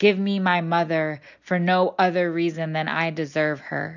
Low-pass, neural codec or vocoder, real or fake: 7.2 kHz; none; real